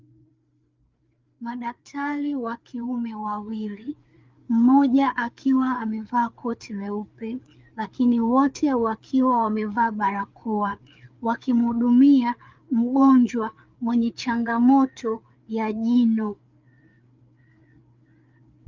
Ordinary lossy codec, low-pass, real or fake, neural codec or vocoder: Opus, 32 kbps; 7.2 kHz; fake; codec, 16 kHz, 4 kbps, FreqCodec, larger model